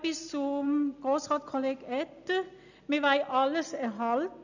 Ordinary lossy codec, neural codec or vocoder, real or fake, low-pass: none; none; real; 7.2 kHz